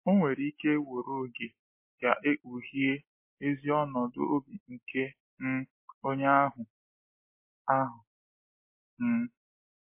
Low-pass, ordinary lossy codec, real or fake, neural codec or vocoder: 3.6 kHz; MP3, 24 kbps; real; none